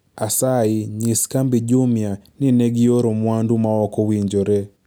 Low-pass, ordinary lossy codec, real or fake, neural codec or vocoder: none; none; real; none